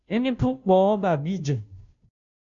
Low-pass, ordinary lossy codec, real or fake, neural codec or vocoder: 7.2 kHz; MP3, 64 kbps; fake; codec, 16 kHz, 0.5 kbps, FunCodec, trained on Chinese and English, 25 frames a second